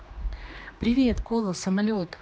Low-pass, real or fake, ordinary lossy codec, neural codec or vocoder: none; fake; none; codec, 16 kHz, 2 kbps, X-Codec, HuBERT features, trained on general audio